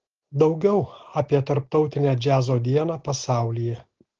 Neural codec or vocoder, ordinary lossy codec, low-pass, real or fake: none; Opus, 16 kbps; 7.2 kHz; real